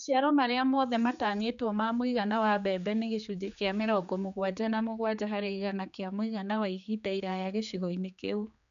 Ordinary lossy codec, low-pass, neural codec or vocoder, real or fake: none; 7.2 kHz; codec, 16 kHz, 4 kbps, X-Codec, HuBERT features, trained on general audio; fake